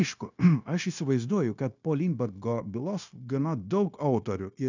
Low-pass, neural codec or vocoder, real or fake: 7.2 kHz; codec, 16 kHz, 0.9 kbps, LongCat-Audio-Codec; fake